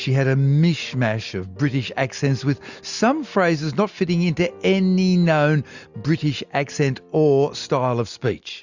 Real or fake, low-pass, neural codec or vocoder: real; 7.2 kHz; none